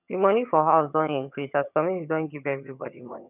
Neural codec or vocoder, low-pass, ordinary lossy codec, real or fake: vocoder, 22.05 kHz, 80 mel bands, HiFi-GAN; 3.6 kHz; none; fake